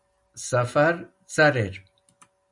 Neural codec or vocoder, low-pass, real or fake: none; 10.8 kHz; real